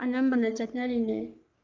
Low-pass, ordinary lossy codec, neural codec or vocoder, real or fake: 7.2 kHz; Opus, 24 kbps; codec, 44.1 kHz, 3.4 kbps, Pupu-Codec; fake